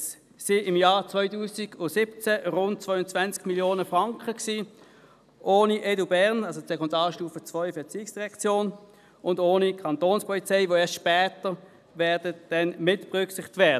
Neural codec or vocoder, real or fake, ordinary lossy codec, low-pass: none; real; none; 14.4 kHz